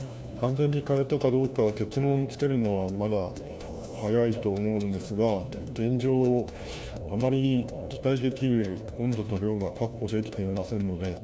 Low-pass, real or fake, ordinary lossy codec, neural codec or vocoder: none; fake; none; codec, 16 kHz, 1 kbps, FunCodec, trained on LibriTTS, 50 frames a second